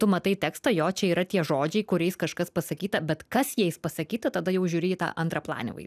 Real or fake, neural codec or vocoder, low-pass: real; none; 14.4 kHz